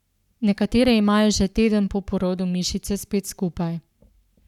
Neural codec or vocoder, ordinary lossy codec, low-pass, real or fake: codec, 44.1 kHz, 7.8 kbps, Pupu-Codec; none; 19.8 kHz; fake